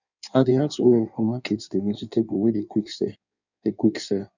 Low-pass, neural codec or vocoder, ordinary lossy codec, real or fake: 7.2 kHz; codec, 16 kHz in and 24 kHz out, 1.1 kbps, FireRedTTS-2 codec; none; fake